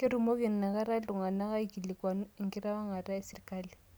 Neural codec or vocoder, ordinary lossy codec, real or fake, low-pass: none; none; real; none